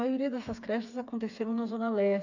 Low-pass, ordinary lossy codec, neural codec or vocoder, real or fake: 7.2 kHz; none; codec, 16 kHz, 4 kbps, FreqCodec, smaller model; fake